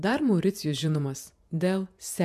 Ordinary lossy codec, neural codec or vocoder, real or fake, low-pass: MP3, 96 kbps; vocoder, 48 kHz, 128 mel bands, Vocos; fake; 14.4 kHz